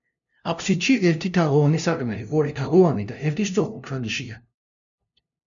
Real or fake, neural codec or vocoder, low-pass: fake; codec, 16 kHz, 0.5 kbps, FunCodec, trained on LibriTTS, 25 frames a second; 7.2 kHz